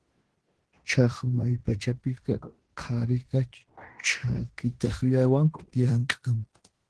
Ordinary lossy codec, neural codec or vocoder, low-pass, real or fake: Opus, 16 kbps; codec, 16 kHz in and 24 kHz out, 0.9 kbps, LongCat-Audio-Codec, fine tuned four codebook decoder; 10.8 kHz; fake